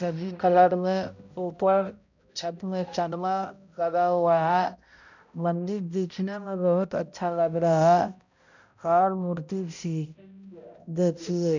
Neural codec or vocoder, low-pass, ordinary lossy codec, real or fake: codec, 16 kHz, 0.5 kbps, X-Codec, HuBERT features, trained on balanced general audio; 7.2 kHz; none; fake